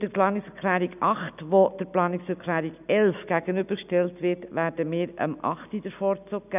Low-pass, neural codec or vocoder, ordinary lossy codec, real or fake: 3.6 kHz; none; none; real